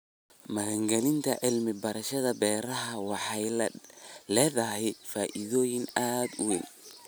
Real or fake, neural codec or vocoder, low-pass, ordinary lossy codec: real; none; none; none